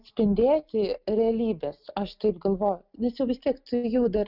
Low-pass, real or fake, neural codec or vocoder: 5.4 kHz; real; none